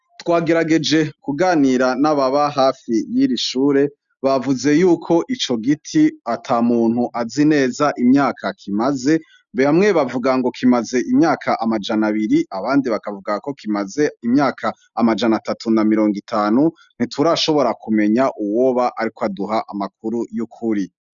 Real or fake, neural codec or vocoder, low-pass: real; none; 7.2 kHz